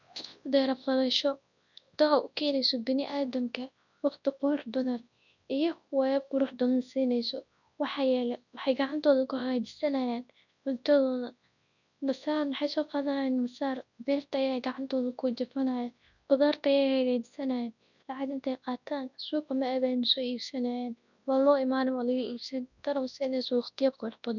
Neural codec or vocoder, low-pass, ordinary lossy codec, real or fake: codec, 24 kHz, 0.9 kbps, WavTokenizer, large speech release; 7.2 kHz; none; fake